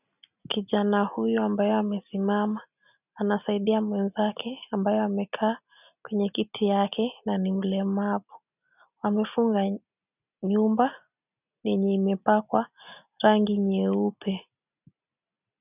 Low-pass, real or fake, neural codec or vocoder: 3.6 kHz; real; none